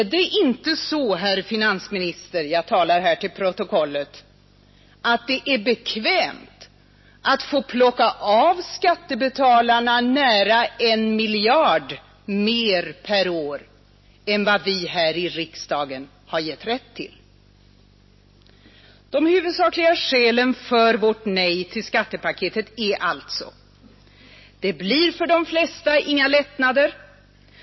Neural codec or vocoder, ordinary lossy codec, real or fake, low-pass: none; MP3, 24 kbps; real; 7.2 kHz